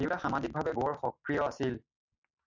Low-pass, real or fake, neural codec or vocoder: 7.2 kHz; real; none